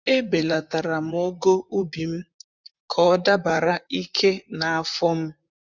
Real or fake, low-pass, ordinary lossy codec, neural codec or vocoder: fake; 7.2 kHz; none; vocoder, 44.1 kHz, 128 mel bands, Pupu-Vocoder